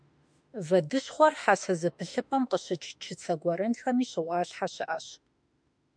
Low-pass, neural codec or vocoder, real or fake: 9.9 kHz; autoencoder, 48 kHz, 32 numbers a frame, DAC-VAE, trained on Japanese speech; fake